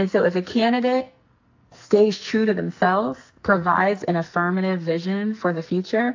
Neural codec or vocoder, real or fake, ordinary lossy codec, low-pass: codec, 44.1 kHz, 2.6 kbps, SNAC; fake; AAC, 48 kbps; 7.2 kHz